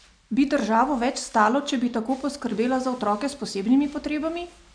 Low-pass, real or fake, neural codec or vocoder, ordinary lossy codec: 9.9 kHz; real; none; none